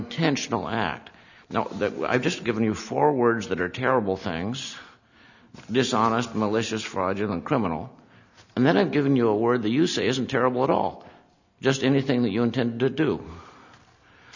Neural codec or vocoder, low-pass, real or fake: none; 7.2 kHz; real